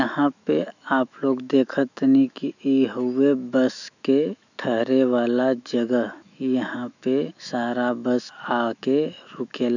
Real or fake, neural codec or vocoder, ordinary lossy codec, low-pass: fake; autoencoder, 48 kHz, 128 numbers a frame, DAC-VAE, trained on Japanese speech; none; 7.2 kHz